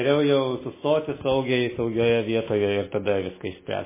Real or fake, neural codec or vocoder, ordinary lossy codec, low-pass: real; none; MP3, 16 kbps; 3.6 kHz